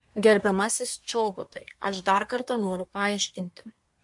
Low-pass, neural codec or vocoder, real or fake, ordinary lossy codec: 10.8 kHz; codec, 24 kHz, 1 kbps, SNAC; fake; MP3, 64 kbps